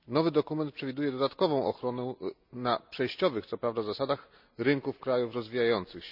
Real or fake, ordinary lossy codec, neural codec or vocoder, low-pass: real; none; none; 5.4 kHz